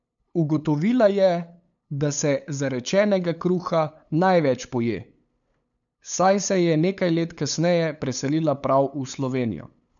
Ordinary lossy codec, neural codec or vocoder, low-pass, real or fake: none; codec, 16 kHz, 8 kbps, FunCodec, trained on LibriTTS, 25 frames a second; 7.2 kHz; fake